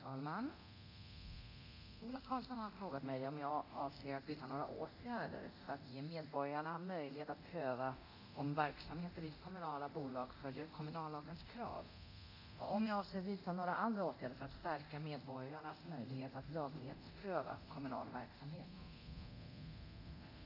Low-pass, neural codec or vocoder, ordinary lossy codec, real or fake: 5.4 kHz; codec, 24 kHz, 0.9 kbps, DualCodec; none; fake